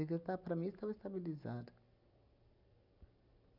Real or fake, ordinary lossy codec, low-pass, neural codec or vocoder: real; none; 5.4 kHz; none